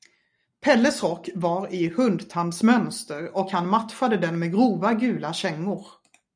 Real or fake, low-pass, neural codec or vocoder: real; 9.9 kHz; none